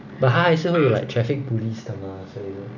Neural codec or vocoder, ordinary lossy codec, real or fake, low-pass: none; none; real; 7.2 kHz